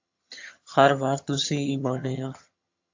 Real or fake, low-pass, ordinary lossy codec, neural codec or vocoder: fake; 7.2 kHz; AAC, 48 kbps; vocoder, 22.05 kHz, 80 mel bands, HiFi-GAN